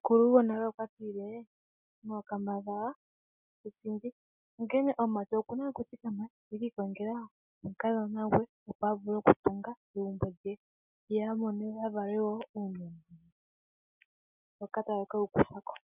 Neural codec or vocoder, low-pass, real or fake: none; 3.6 kHz; real